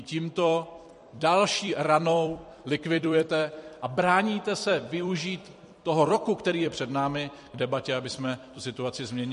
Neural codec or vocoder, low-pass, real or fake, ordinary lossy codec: vocoder, 44.1 kHz, 128 mel bands every 256 samples, BigVGAN v2; 14.4 kHz; fake; MP3, 48 kbps